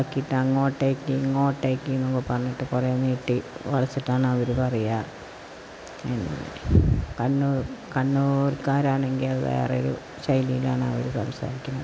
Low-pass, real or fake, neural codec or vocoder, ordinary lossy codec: none; real; none; none